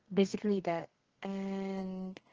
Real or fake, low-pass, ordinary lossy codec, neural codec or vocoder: fake; 7.2 kHz; Opus, 16 kbps; codec, 32 kHz, 1.9 kbps, SNAC